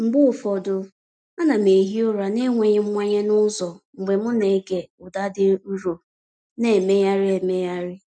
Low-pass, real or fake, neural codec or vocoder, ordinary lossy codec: 9.9 kHz; real; none; none